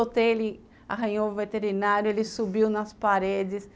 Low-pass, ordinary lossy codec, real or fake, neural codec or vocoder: none; none; real; none